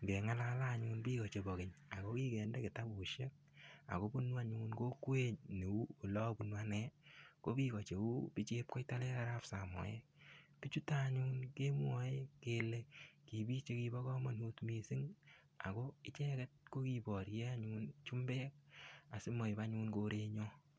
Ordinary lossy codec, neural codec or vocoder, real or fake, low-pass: none; none; real; none